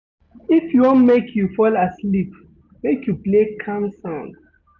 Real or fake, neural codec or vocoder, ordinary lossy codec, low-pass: real; none; none; 7.2 kHz